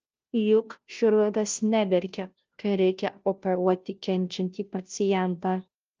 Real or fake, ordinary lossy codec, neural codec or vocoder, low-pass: fake; Opus, 24 kbps; codec, 16 kHz, 0.5 kbps, FunCodec, trained on Chinese and English, 25 frames a second; 7.2 kHz